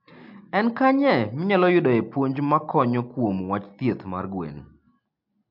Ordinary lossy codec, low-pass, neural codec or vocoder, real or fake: MP3, 48 kbps; 5.4 kHz; none; real